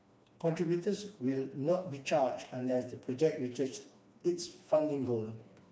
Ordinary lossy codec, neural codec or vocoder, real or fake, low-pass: none; codec, 16 kHz, 2 kbps, FreqCodec, smaller model; fake; none